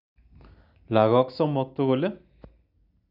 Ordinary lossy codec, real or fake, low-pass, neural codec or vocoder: none; real; 5.4 kHz; none